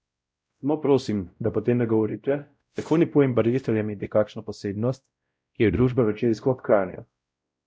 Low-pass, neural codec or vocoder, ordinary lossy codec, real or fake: none; codec, 16 kHz, 0.5 kbps, X-Codec, WavLM features, trained on Multilingual LibriSpeech; none; fake